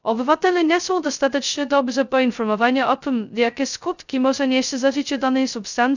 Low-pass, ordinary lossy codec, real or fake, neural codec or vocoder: 7.2 kHz; none; fake; codec, 16 kHz, 0.2 kbps, FocalCodec